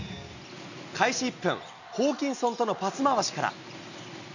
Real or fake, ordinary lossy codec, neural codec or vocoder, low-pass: real; none; none; 7.2 kHz